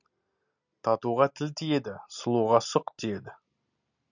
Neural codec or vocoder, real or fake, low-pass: none; real; 7.2 kHz